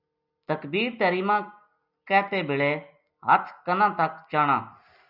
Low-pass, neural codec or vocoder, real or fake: 5.4 kHz; none; real